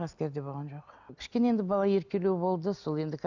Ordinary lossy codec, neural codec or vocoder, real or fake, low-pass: none; none; real; 7.2 kHz